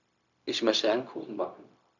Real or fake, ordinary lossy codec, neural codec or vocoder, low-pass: fake; none; codec, 16 kHz, 0.4 kbps, LongCat-Audio-Codec; 7.2 kHz